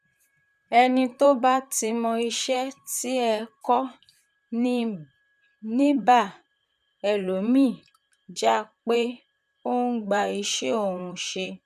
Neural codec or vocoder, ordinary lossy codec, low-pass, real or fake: vocoder, 44.1 kHz, 128 mel bands, Pupu-Vocoder; none; 14.4 kHz; fake